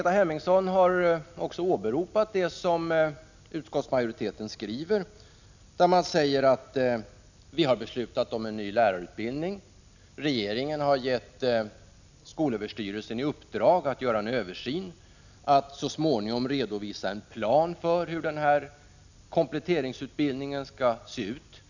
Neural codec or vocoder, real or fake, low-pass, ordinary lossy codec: none; real; 7.2 kHz; none